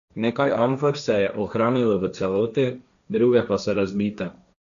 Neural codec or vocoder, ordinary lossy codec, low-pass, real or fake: codec, 16 kHz, 1.1 kbps, Voila-Tokenizer; none; 7.2 kHz; fake